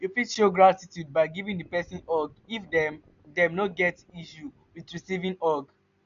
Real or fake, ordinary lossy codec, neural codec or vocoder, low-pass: real; AAC, 96 kbps; none; 7.2 kHz